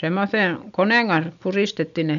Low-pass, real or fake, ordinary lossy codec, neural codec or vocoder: 7.2 kHz; real; none; none